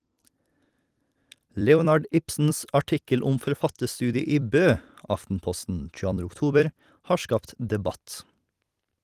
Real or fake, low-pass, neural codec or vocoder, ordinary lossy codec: fake; 14.4 kHz; vocoder, 44.1 kHz, 128 mel bands every 256 samples, BigVGAN v2; Opus, 24 kbps